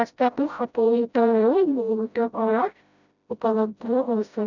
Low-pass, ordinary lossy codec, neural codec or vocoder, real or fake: 7.2 kHz; none; codec, 16 kHz, 0.5 kbps, FreqCodec, smaller model; fake